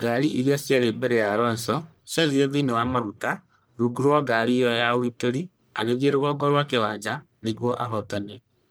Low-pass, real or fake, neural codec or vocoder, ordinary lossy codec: none; fake; codec, 44.1 kHz, 1.7 kbps, Pupu-Codec; none